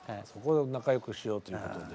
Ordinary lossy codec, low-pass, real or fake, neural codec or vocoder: none; none; real; none